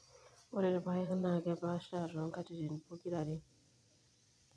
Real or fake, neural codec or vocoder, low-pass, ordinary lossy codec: fake; vocoder, 22.05 kHz, 80 mel bands, Vocos; none; none